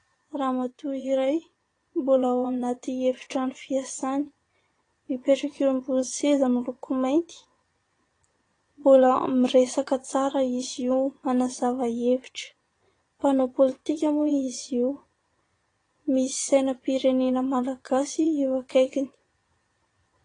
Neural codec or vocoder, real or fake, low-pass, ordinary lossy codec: vocoder, 22.05 kHz, 80 mel bands, Vocos; fake; 9.9 kHz; AAC, 32 kbps